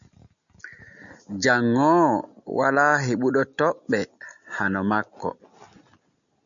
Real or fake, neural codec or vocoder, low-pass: real; none; 7.2 kHz